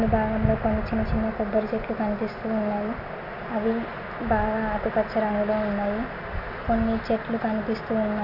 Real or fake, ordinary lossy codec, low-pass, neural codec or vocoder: real; none; 5.4 kHz; none